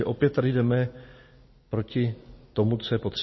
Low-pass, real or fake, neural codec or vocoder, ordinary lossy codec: 7.2 kHz; real; none; MP3, 24 kbps